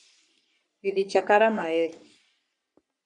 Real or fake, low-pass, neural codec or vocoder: fake; 10.8 kHz; codec, 44.1 kHz, 3.4 kbps, Pupu-Codec